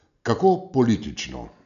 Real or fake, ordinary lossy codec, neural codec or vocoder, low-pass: real; none; none; 7.2 kHz